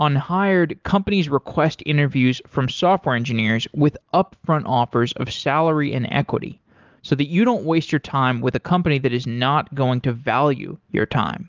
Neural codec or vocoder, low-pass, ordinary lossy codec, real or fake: none; 7.2 kHz; Opus, 32 kbps; real